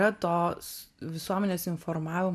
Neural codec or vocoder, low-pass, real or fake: none; 14.4 kHz; real